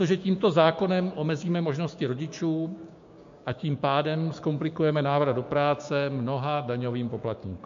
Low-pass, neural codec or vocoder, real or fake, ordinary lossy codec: 7.2 kHz; codec, 16 kHz, 6 kbps, DAC; fake; MP3, 48 kbps